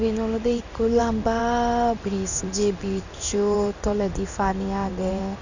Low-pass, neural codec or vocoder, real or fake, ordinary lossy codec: 7.2 kHz; codec, 16 kHz in and 24 kHz out, 1 kbps, XY-Tokenizer; fake; none